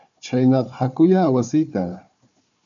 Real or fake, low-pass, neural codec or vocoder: fake; 7.2 kHz; codec, 16 kHz, 4 kbps, FunCodec, trained on Chinese and English, 50 frames a second